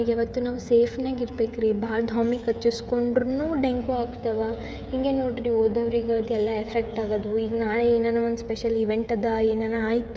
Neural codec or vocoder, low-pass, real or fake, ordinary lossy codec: codec, 16 kHz, 16 kbps, FreqCodec, smaller model; none; fake; none